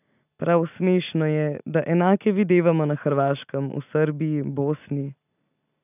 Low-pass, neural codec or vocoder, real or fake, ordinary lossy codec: 3.6 kHz; none; real; none